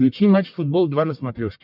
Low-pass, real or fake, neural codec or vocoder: 5.4 kHz; fake; codec, 44.1 kHz, 1.7 kbps, Pupu-Codec